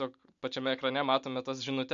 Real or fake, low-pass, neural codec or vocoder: real; 7.2 kHz; none